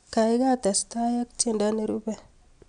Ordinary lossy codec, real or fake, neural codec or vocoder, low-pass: none; real; none; 9.9 kHz